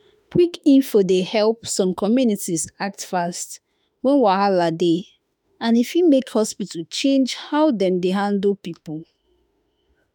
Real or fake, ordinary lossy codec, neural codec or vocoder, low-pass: fake; none; autoencoder, 48 kHz, 32 numbers a frame, DAC-VAE, trained on Japanese speech; none